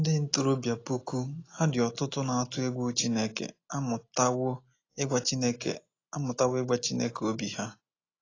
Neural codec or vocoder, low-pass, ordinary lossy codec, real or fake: none; 7.2 kHz; AAC, 32 kbps; real